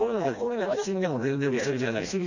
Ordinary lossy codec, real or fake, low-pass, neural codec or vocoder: none; fake; 7.2 kHz; codec, 16 kHz, 1 kbps, FreqCodec, smaller model